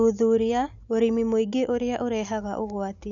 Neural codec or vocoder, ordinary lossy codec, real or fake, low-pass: none; none; real; 7.2 kHz